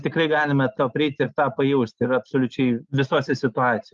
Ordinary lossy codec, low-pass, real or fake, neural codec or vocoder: Opus, 32 kbps; 7.2 kHz; real; none